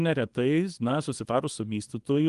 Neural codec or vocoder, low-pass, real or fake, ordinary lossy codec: codec, 24 kHz, 0.9 kbps, WavTokenizer, small release; 10.8 kHz; fake; Opus, 24 kbps